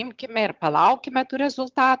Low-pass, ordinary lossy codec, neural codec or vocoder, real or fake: 7.2 kHz; Opus, 32 kbps; vocoder, 22.05 kHz, 80 mel bands, HiFi-GAN; fake